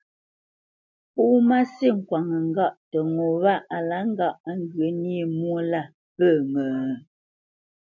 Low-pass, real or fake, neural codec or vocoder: 7.2 kHz; real; none